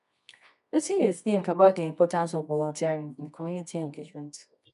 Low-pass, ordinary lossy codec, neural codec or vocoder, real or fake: 10.8 kHz; none; codec, 24 kHz, 0.9 kbps, WavTokenizer, medium music audio release; fake